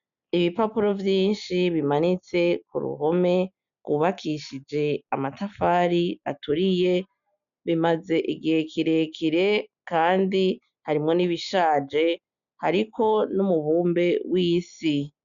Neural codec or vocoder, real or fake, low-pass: none; real; 7.2 kHz